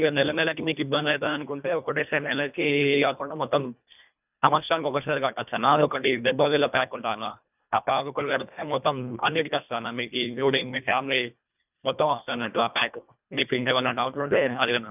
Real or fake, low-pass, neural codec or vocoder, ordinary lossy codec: fake; 3.6 kHz; codec, 24 kHz, 1.5 kbps, HILCodec; none